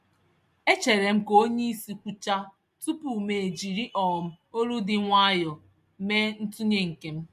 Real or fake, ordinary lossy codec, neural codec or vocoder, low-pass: real; MP3, 64 kbps; none; 14.4 kHz